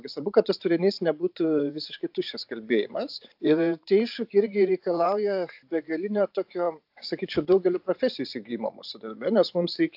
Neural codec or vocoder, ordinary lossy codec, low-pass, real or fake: vocoder, 44.1 kHz, 128 mel bands every 512 samples, BigVGAN v2; AAC, 48 kbps; 5.4 kHz; fake